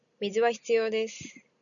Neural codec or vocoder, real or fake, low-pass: none; real; 7.2 kHz